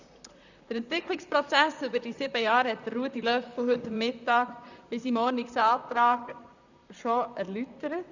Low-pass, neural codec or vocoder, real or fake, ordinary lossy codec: 7.2 kHz; vocoder, 44.1 kHz, 128 mel bands, Pupu-Vocoder; fake; none